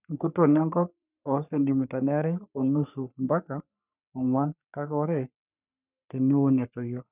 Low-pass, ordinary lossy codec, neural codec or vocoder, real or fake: 3.6 kHz; none; codec, 44.1 kHz, 3.4 kbps, Pupu-Codec; fake